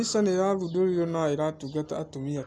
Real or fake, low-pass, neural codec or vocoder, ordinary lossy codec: real; none; none; none